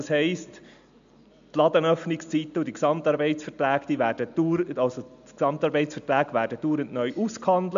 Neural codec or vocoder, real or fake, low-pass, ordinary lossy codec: none; real; 7.2 kHz; MP3, 64 kbps